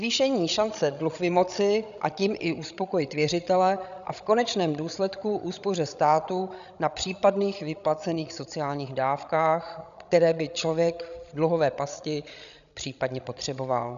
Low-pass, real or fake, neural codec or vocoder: 7.2 kHz; fake; codec, 16 kHz, 16 kbps, FreqCodec, larger model